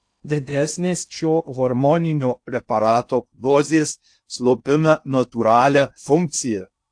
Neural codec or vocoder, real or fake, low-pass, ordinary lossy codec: codec, 16 kHz in and 24 kHz out, 0.6 kbps, FocalCodec, streaming, 2048 codes; fake; 9.9 kHz; AAC, 64 kbps